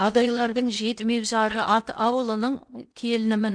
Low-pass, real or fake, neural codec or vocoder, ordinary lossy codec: 9.9 kHz; fake; codec, 16 kHz in and 24 kHz out, 0.8 kbps, FocalCodec, streaming, 65536 codes; none